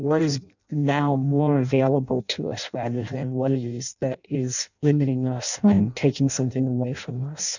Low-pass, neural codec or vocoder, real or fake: 7.2 kHz; codec, 16 kHz in and 24 kHz out, 0.6 kbps, FireRedTTS-2 codec; fake